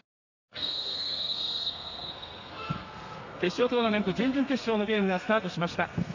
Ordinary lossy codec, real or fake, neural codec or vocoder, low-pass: none; fake; codec, 32 kHz, 1.9 kbps, SNAC; 7.2 kHz